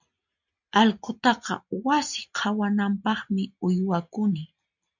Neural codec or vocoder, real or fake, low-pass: none; real; 7.2 kHz